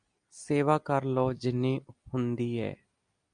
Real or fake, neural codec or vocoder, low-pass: real; none; 9.9 kHz